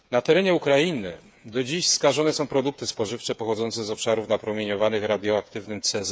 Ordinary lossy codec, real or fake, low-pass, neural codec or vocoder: none; fake; none; codec, 16 kHz, 8 kbps, FreqCodec, smaller model